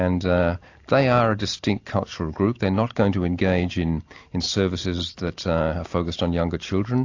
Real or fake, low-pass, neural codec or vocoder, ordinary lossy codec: real; 7.2 kHz; none; AAC, 48 kbps